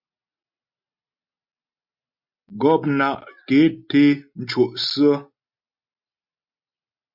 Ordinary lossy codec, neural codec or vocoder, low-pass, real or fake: Opus, 64 kbps; none; 5.4 kHz; real